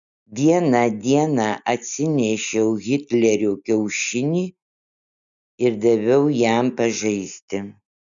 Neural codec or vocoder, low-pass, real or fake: none; 7.2 kHz; real